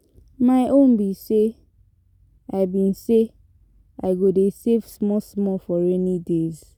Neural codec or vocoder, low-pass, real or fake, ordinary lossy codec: none; 19.8 kHz; real; none